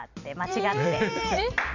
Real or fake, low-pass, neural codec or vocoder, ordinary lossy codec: fake; 7.2 kHz; vocoder, 44.1 kHz, 128 mel bands every 256 samples, BigVGAN v2; none